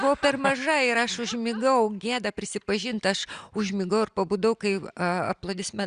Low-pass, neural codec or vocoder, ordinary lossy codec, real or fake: 9.9 kHz; none; Opus, 64 kbps; real